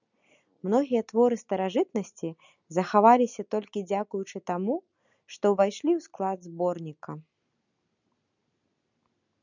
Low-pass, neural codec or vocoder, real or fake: 7.2 kHz; none; real